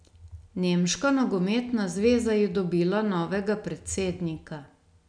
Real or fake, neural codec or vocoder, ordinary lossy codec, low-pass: real; none; none; 9.9 kHz